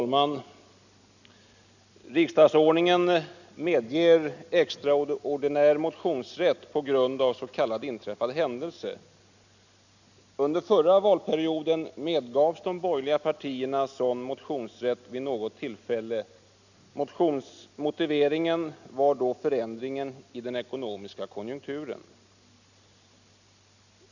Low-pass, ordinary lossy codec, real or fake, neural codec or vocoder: 7.2 kHz; none; real; none